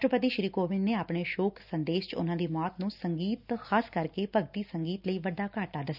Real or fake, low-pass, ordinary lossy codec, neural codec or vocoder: real; 5.4 kHz; none; none